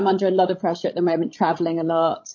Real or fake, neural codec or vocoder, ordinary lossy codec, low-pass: fake; codec, 16 kHz, 16 kbps, FreqCodec, larger model; MP3, 32 kbps; 7.2 kHz